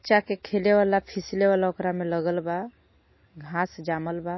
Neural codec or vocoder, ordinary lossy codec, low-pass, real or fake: none; MP3, 24 kbps; 7.2 kHz; real